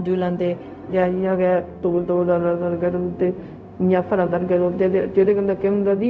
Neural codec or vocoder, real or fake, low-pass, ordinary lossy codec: codec, 16 kHz, 0.4 kbps, LongCat-Audio-Codec; fake; none; none